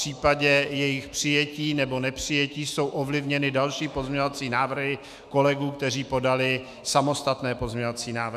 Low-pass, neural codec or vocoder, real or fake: 14.4 kHz; autoencoder, 48 kHz, 128 numbers a frame, DAC-VAE, trained on Japanese speech; fake